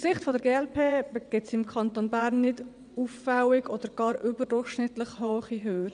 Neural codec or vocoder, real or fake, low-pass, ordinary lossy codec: vocoder, 22.05 kHz, 80 mel bands, WaveNeXt; fake; 9.9 kHz; none